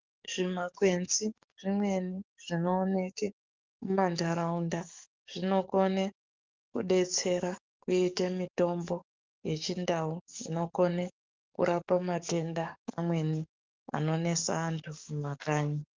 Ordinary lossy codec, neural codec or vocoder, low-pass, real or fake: Opus, 24 kbps; codec, 44.1 kHz, 7.8 kbps, DAC; 7.2 kHz; fake